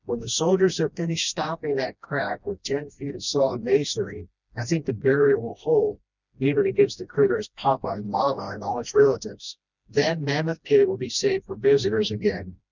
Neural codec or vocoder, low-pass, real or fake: codec, 16 kHz, 1 kbps, FreqCodec, smaller model; 7.2 kHz; fake